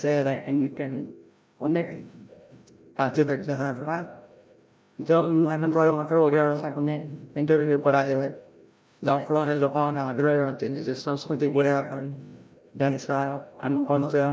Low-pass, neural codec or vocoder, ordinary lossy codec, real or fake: none; codec, 16 kHz, 0.5 kbps, FreqCodec, larger model; none; fake